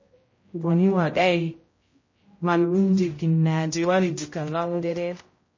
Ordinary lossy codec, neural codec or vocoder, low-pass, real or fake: MP3, 32 kbps; codec, 16 kHz, 0.5 kbps, X-Codec, HuBERT features, trained on general audio; 7.2 kHz; fake